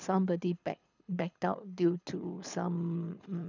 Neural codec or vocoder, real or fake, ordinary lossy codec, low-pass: codec, 24 kHz, 6 kbps, HILCodec; fake; none; 7.2 kHz